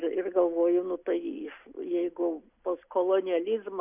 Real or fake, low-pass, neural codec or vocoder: real; 3.6 kHz; none